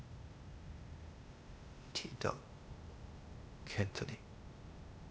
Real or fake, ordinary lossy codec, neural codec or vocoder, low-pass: fake; none; codec, 16 kHz, 0.8 kbps, ZipCodec; none